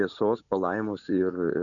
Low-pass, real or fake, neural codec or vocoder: 7.2 kHz; real; none